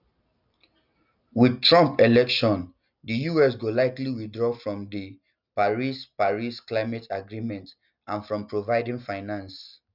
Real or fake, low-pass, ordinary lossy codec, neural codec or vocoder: real; 5.4 kHz; none; none